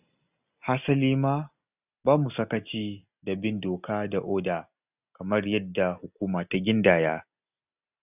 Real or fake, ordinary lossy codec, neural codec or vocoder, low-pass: real; none; none; 3.6 kHz